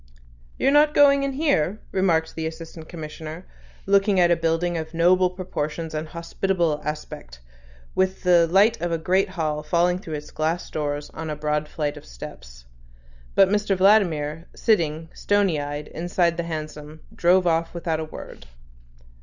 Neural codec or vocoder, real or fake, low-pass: none; real; 7.2 kHz